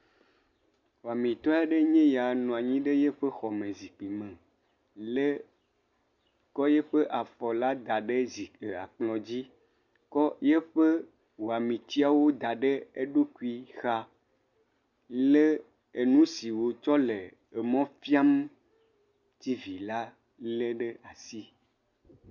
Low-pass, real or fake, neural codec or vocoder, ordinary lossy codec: 7.2 kHz; real; none; Opus, 64 kbps